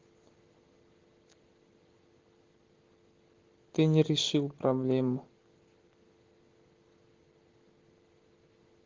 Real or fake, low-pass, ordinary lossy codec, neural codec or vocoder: real; 7.2 kHz; Opus, 16 kbps; none